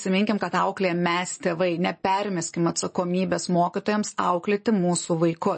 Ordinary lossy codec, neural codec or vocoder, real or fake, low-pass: MP3, 32 kbps; none; real; 9.9 kHz